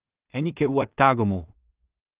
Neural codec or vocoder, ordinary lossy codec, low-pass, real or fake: codec, 16 kHz in and 24 kHz out, 0.4 kbps, LongCat-Audio-Codec, two codebook decoder; Opus, 24 kbps; 3.6 kHz; fake